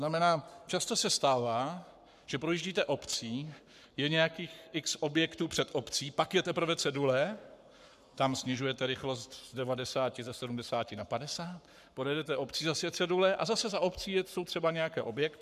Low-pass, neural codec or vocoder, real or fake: 14.4 kHz; codec, 44.1 kHz, 7.8 kbps, Pupu-Codec; fake